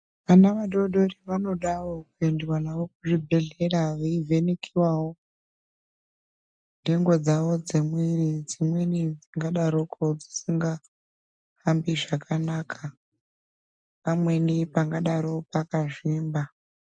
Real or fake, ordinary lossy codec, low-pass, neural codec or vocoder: real; MP3, 96 kbps; 9.9 kHz; none